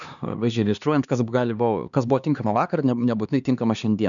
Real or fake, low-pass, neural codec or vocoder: fake; 7.2 kHz; codec, 16 kHz, 2 kbps, X-Codec, HuBERT features, trained on LibriSpeech